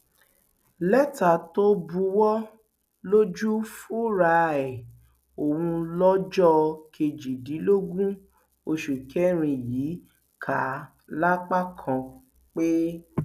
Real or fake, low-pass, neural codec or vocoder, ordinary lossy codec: real; 14.4 kHz; none; none